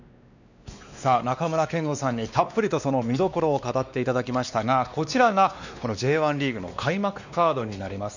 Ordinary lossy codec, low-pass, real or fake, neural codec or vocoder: none; 7.2 kHz; fake; codec, 16 kHz, 2 kbps, X-Codec, WavLM features, trained on Multilingual LibriSpeech